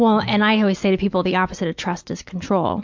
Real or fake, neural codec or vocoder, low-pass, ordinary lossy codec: real; none; 7.2 kHz; MP3, 64 kbps